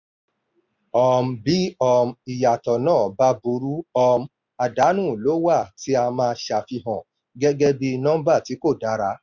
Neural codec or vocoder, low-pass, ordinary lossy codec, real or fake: none; 7.2 kHz; none; real